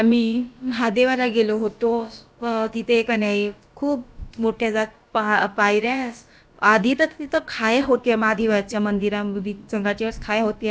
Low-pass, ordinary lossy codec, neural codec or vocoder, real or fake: none; none; codec, 16 kHz, about 1 kbps, DyCAST, with the encoder's durations; fake